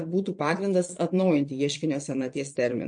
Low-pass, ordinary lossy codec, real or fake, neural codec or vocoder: 9.9 kHz; MP3, 48 kbps; fake; vocoder, 22.05 kHz, 80 mel bands, Vocos